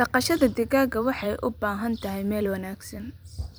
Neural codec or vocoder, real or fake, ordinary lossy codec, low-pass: vocoder, 44.1 kHz, 128 mel bands every 256 samples, BigVGAN v2; fake; none; none